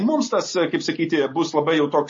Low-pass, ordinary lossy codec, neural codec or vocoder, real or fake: 7.2 kHz; MP3, 32 kbps; none; real